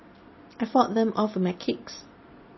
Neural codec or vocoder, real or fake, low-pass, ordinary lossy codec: none; real; 7.2 kHz; MP3, 24 kbps